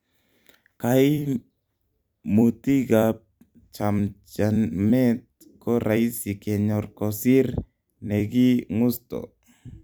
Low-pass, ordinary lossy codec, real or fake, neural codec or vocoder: none; none; fake; vocoder, 44.1 kHz, 128 mel bands every 256 samples, BigVGAN v2